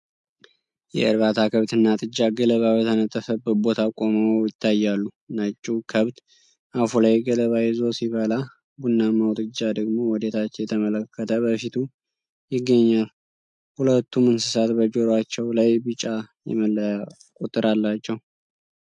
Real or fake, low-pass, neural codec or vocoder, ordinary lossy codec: real; 10.8 kHz; none; MP3, 64 kbps